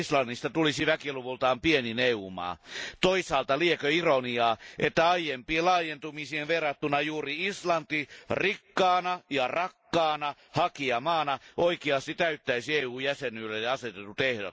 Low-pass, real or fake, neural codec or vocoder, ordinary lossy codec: none; real; none; none